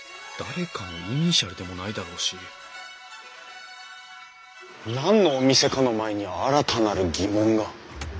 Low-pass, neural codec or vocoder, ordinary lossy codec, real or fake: none; none; none; real